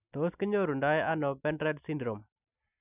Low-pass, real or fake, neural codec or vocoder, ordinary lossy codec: 3.6 kHz; real; none; none